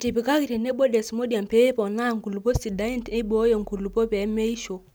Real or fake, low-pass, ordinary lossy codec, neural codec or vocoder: real; none; none; none